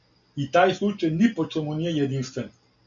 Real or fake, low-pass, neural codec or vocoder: real; 7.2 kHz; none